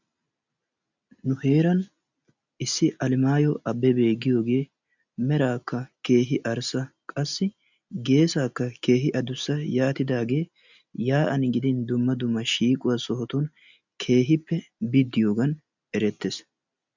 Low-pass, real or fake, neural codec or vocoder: 7.2 kHz; real; none